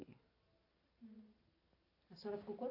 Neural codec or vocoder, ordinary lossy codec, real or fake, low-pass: none; none; real; 5.4 kHz